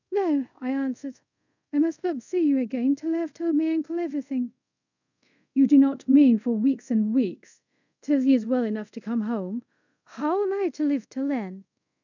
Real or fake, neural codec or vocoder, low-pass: fake; codec, 24 kHz, 0.5 kbps, DualCodec; 7.2 kHz